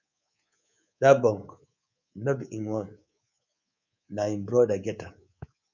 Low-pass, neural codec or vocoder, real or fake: 7.2 kHz; codec, 24 kHz, 3.1 kbps, DualCodec; fake